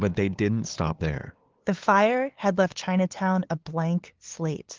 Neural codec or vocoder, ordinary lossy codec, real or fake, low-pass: codec, 16 kHz, 8 kbps, FunCodec, trained on LibriTTS, 25 frames a second; Opus, 16 kbps; fake; 7.2 kHz